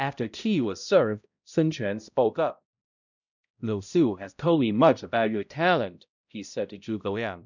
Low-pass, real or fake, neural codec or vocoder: 7.2 kHz; fake; codec, 16 kHz, 0.5 kbps, X-Codec, HuBERT features, trained on balanced general audio